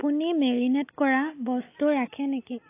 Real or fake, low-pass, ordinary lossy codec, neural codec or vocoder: real; 3.6 kHz; AAC, 24 kbps; none